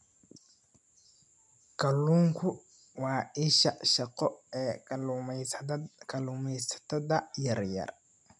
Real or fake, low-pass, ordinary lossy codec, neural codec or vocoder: real; 10.8 kHz; none; none